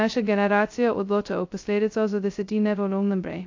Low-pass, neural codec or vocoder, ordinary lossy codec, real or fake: 7.2 kHz; codec, 16 kHz, 0.2 kbps, FocalCodec; AAC, 48 kbps; fake